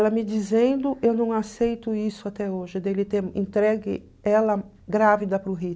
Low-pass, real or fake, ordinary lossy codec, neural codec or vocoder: none; real; none; none